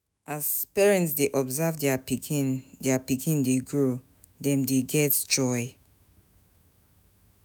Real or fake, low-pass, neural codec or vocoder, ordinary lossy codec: fake; none; autoencoder, 48 kHz, 128 numbers a frame, DAC-VAE, trained on Japanese speech; none